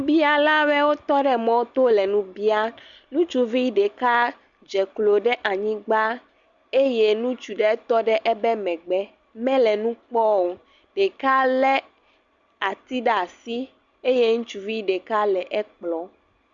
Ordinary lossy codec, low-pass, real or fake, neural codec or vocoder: Opus, 64 kbps; 7.2 kHz; real; none